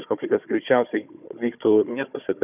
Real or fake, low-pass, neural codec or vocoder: fake; 3.6 kHz; codec, 16 kHz, 4 kbps, FunCodec, trained on Chinese and English, 50 frames a second